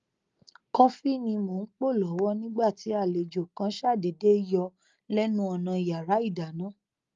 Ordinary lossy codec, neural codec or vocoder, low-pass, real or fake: Opus, 32 kbps; none; 7.2 kHz; real